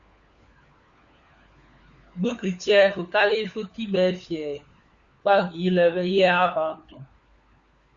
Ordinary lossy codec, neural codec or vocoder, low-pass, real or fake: Opus, 64 kbps; codec, 16 kHz, 4 kbps, FunCodec, trained on LibriTTS, 50 frames a second; 7.2 kHz; fake